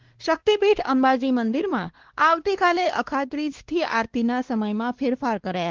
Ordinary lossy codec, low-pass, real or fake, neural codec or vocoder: Opus, 16 kbps; 7.2 kHz; fake; codec, 16 kHz, 2 kbps, X-Codec, WavLM features, trained on Multilingual LibriSpeech